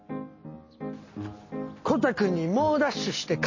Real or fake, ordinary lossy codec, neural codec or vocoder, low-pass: real; MP3, 32 kbps; none; 7.2 kHz